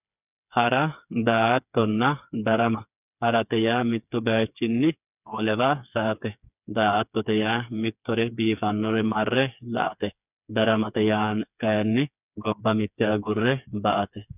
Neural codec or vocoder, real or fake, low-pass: codec, 16 kHz, 4 kbps, FreqCodec, smaller model; fake; 3.6 kHz